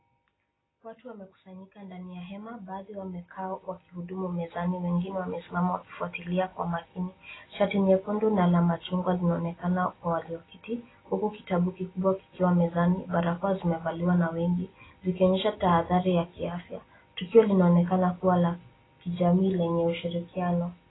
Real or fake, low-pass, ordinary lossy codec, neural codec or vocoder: real; 7.2 kHz; AAC, 16 kbps; none